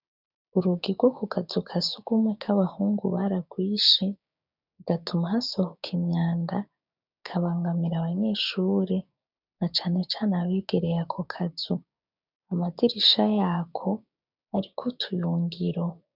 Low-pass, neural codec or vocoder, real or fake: 5.4 kHz; codec, 16 kHz, 6 kbps, DAC; fake